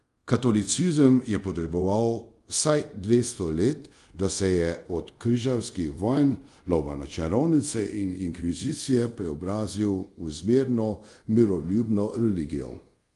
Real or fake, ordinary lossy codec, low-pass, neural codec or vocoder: fake; Opus, 32 kbps; 10.8 kHz; codec, 24 kHz, 0.5 kbps, DualCodec